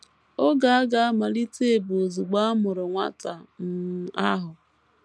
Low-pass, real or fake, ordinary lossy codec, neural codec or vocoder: none; real; none; none